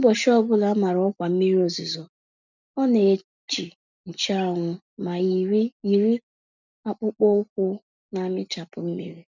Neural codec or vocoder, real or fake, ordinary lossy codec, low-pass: none; real; none; 7.2 kHz